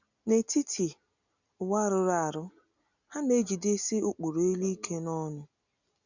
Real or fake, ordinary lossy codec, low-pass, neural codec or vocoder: real; none; 7.2 kHz; none